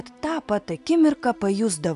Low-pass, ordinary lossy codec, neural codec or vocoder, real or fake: 10.8 kHz; AAC, 96 kbps; none; real